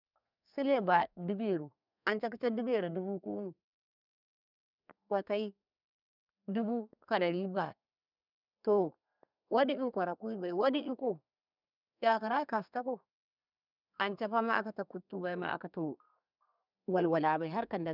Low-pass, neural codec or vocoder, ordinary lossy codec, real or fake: 5.4 kHz; codec, 32 kHz, 1.9 kbps, SNAC; none; fake